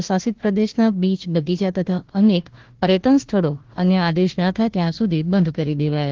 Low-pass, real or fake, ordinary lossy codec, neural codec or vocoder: 7.2 kHz; fake; Opus, 16 kbps; codec, 16 kHz, 1 kbps, FunCodec, trained on Chinese and English, 50 frames a second